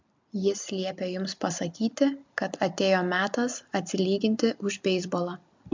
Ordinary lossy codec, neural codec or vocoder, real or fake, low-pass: MP3, 64 kbps; none; real; 7.2 kHz